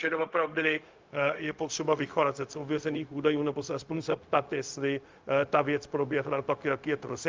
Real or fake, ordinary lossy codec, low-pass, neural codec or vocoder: fake; Opus, 32 kbps; 7.2 kHz; codec, 16 kHz, 0.4 kbps, LongCat-Audio-Codec